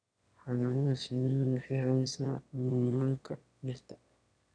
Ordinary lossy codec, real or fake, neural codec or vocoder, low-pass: none; fake; autoencoder, 22.05 kHz, a latent of 192 numbers a frame, VITS, trained on one speaker; 9.9 kHz